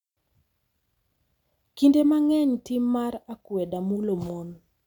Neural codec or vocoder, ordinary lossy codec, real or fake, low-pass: none; none; real; 19.8 kHz